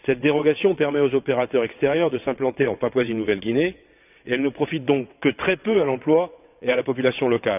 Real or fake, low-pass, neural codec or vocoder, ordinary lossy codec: fake; 3.6 kHz; vocoder, 22.05 kHz, 80 mel bands, WaveNeXt; none